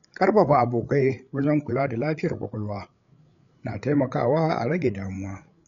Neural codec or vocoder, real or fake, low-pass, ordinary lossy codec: codec, 16 kHz, 8 kbps, FreqCodec, larger model; fake; 7.2 kHz; none